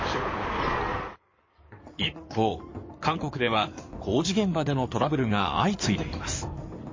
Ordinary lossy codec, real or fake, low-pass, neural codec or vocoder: MP3, 32 kbps; fake; 7.2 kHz; codec, 16 kHz in and 24 kHz out, 2.2 kbps, FireRedTTS-2 codec